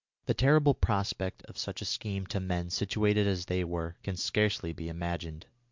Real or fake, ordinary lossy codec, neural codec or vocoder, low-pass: real; MP3, 64 kbps; none; 7.2 kHz